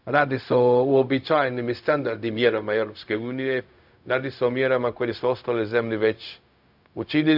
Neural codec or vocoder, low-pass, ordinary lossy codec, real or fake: codec, 16 kHz, 0.4 kbps, LongCat-Audio-Codec; 5.4 kHz; none; fake